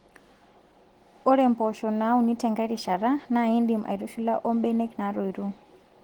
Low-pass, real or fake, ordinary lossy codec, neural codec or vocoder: 19.8 kHz; real; Opus, 16 kbps; none